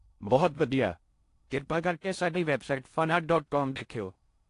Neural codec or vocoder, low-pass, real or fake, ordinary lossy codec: codec, 16 kHz in and 24 kHz out, 0.6 kbps, FocalCodec, streaming, 4096 codes; 10.8 kHz; fake; AAC, 48 kbps